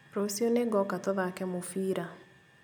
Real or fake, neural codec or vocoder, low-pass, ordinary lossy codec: real; none; none; none